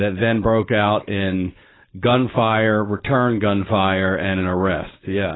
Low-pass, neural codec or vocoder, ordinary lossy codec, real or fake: 7.2 kHz; codec, 16 kHz, 4.8 kbps, FACodec; AAC, 16 kbps; fake